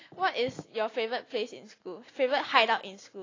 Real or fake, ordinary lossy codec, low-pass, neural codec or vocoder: real; AAC, 32 kbps; 7.2 kHz; none